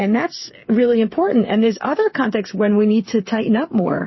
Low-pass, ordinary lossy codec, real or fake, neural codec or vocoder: 7.2 kHz; MP3, 24 kbps; fake; codec, 16 kHz, 8 kbps, FreqCodec, smaller model